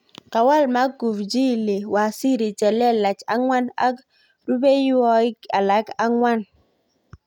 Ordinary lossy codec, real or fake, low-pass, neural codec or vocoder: none; real; 19.8 kHz; none